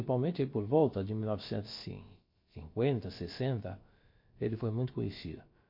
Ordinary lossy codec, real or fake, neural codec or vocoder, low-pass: MP3, 32 kbps; fake; codec, 16 kHz, about 1 kbps, DyCAST, with the encoder's durations; 5.4 kHz